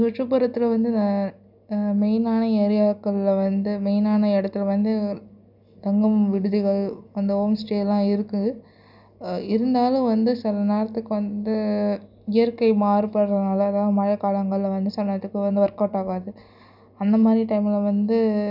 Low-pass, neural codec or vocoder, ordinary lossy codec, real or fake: 5.4 kHz; none; none; real